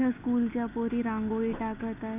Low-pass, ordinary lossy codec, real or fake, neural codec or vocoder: 3.6 kHz; none; real; none